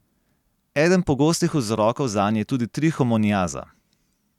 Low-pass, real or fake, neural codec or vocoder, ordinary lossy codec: 19.8 kHz; real; none; none